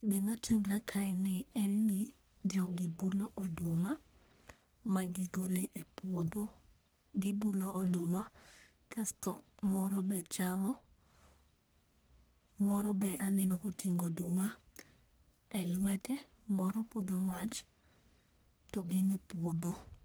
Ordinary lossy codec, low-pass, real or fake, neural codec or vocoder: none; none; fake; codec, 44.1 kHz, 1.7 kbps, Pupu-Codec